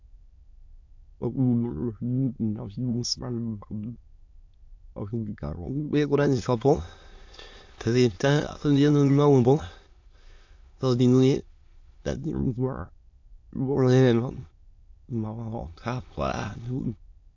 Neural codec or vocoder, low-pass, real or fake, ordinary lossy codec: autoencoder, 22.05 kHz, a latent of 192 numbers a frame, VITS, trained on many speakers; 7.2 kHz; fake; AAC, 48 kbps